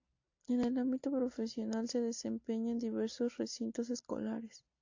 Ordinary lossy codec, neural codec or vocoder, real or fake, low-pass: AAC, 48 kbps; none; real; 7.2 kHz